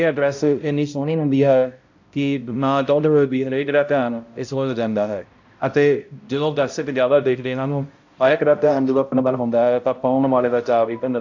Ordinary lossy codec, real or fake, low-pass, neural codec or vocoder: AAC, 48 kbps; fake; 7.2 kHz; codec, 16 kHz, 0.5 kbps, X-Codec, HuBERT features, trained on balanced general audio